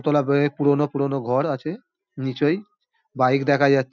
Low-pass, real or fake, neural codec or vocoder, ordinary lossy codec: 7.2 kHz; real; none; none